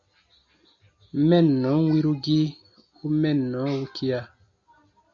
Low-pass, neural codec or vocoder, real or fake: 7.2 kHz; none; real